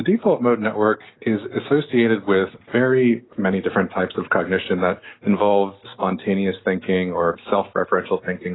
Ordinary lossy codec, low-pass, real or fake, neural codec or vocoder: AAC, 16 kbps; 7.2 kHz; real; none